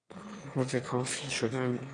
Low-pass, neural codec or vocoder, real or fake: 9.9 kHz; autoencoder, 22.05 kHz, a latent of 192 numbers a frame, VITS, trained on one speaker; fake